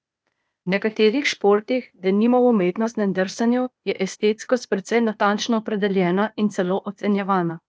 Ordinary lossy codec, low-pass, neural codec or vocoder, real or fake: none; none; codec, 16 kHz, 0.8 kbps, ZipCodec; fake